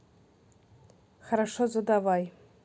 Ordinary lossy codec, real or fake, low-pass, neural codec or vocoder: none; real; none; none